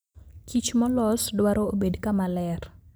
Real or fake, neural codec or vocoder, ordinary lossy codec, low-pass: real; none; none; none